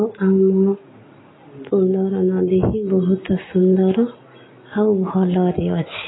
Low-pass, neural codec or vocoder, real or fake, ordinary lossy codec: 7.2 kHz; none; real; AAC, 16 kbps